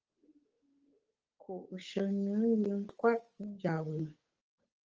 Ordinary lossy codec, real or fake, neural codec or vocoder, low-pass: Opus, 24 kbps; fake; codec, 16 kHz, 8 kbps, FunCodec, trained on Chinese and English, 25 frames a second; 7.2 kHz